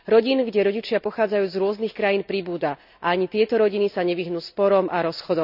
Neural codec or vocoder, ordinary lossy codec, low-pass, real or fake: none; none; 5.4 kHz; real